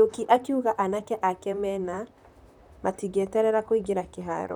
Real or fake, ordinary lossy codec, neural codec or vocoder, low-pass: fake; none; vocoder, 44.1 kHz, 128 mel bands, Pupu-Vocoder; 19.8 kHz